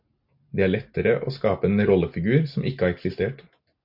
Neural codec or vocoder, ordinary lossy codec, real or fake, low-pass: none; MP3, 48 kbps; real; 5.4 kHz